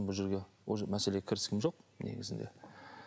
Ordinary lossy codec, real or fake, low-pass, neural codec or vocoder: none; real; none; none